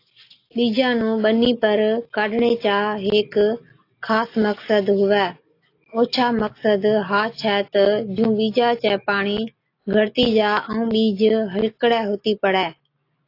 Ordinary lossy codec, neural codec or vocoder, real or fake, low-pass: AAC, 32 kbps; none; real; 5.4 kHz